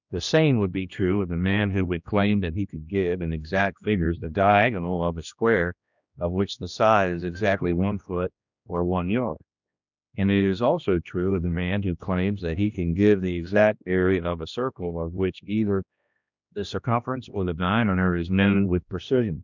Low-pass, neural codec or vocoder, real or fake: 7.2 kHz; codec, 16 kHz, 1 kbps, X-Codec, HuBERT features, trained on general audio; fake